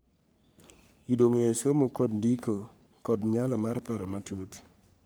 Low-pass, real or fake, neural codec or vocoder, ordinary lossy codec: none; fake; codec, 44.1 kHz, 3.4 kbps, Pupu-Codec; none